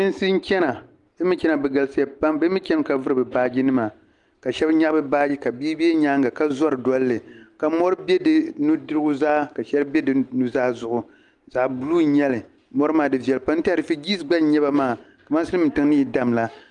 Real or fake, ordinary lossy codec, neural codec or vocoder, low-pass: real; Opus, 32 kbps; none; 10.8 kHz